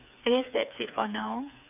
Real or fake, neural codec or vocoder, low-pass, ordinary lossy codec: fake; codec, 16 kHz, 4 kbps, FreqCodec, smaller model; 3.6 kHz; none